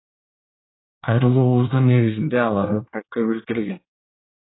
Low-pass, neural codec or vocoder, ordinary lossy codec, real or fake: 7.2 kHz; codec, 24 kHz, 1 kbps, SNAC; AAC, 16 kbps; fake